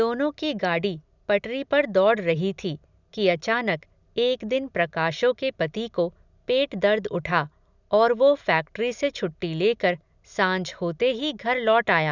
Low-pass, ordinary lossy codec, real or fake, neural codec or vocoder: 7.2 kHz; none; real; none